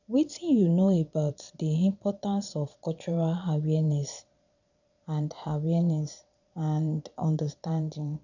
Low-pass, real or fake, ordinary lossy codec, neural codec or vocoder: 7.2 kHz; fake; none; vocoder, 22.05 kHz, 80 mel bands, Vocos